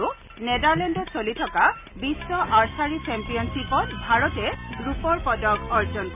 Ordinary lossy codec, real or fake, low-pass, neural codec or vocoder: none; real; 3.6 kHz; none